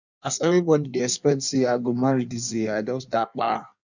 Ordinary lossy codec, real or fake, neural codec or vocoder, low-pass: none; fake; codec, 16 kHz in and 24 kHz out, 1.1 kbps, FireRedTTS-2 codec; 7.2 kHz